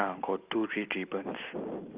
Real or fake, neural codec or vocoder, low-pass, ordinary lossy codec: real; none; 3.6 kHz; Opus, 24 kbps